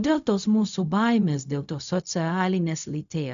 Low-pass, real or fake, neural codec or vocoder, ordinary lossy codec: 7.2 kHz; fake; codec, 16 kHz, 0.4 kbps, LongCat-Audio-Codec; MP3, 64 kbps